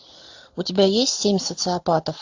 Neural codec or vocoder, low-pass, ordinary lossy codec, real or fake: none; 7.2 kHz; AAC, 48 kbps; real